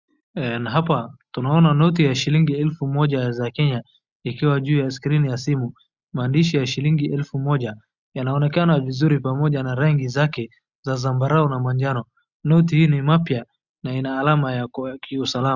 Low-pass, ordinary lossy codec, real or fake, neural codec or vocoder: 7.2 kHz; Opus, 64 kbps; real; none